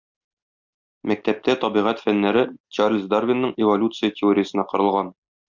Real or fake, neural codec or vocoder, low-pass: real; none; 7.2 kHz